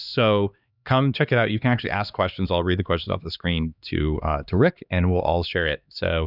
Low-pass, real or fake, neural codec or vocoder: 5.4 kHz; fake; codec, 16 kHz, 2 kbps, X-Codec, HuBERT features, trained on LibriSpeech